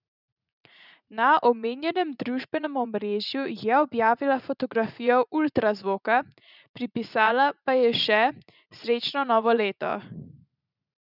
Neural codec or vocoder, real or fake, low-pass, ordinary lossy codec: vocoder, 44.1 kHz, 80 mel bands, Vocos; fake; 5.4 kHz; none